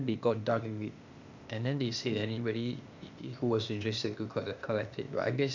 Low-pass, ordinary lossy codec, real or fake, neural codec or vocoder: 7.2 kHz; none; fake; codec, 16 kHz, 0.8 kbps, ZipCodec